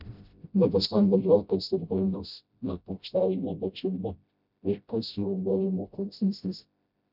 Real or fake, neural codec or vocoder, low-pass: fake; codec, 16 kHz, 0.5 kbps, FreqCodec, smaller model; 5.4 kHz